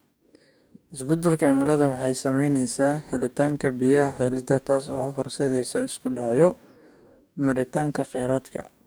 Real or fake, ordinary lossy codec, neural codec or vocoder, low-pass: fake; none; codec, 44.1 kHz, 2.6 kbps, DAC; none